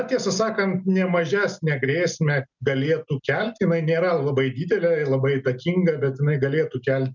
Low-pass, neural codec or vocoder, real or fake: 7.2 kHz; none; real